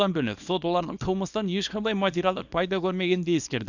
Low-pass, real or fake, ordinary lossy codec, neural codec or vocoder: 7.2 kHz; fake; none; codec, 24 kHz, 0.9 kbps, WavTokenizer, small release